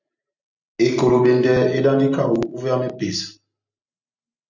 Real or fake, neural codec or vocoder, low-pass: real; none; 7.2 kHz